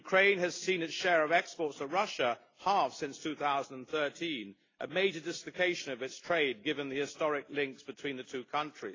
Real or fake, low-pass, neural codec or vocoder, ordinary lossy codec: real; 7.2 kHz; none; AAC, 32 kbps